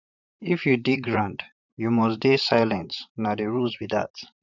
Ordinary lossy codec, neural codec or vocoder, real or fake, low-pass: none; vocoder, 44.1 kHz, 128 mel bands, Pupu-Vocoder; fake; 7.2 kHz